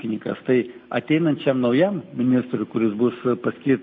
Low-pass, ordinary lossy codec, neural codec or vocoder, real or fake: 7.2 kHz; MP3, 32 kbps; none; real